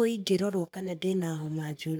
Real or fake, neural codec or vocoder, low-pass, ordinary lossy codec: fake; codec, 44.1 kHz, 3.4 kbps, Pupu-Codec; none; none